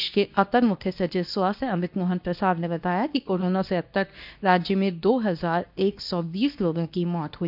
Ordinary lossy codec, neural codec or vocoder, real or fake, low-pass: none; codec, 24 kHz, 0.9 kbps, WavTokenizer, medium speech release version 2; fake; 5.4 kHz